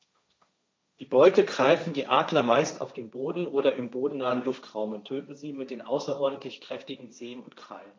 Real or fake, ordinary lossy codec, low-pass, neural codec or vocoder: fake; none; none; codec, 16 kHz, 1.1 kbps, Voila-Tokenizer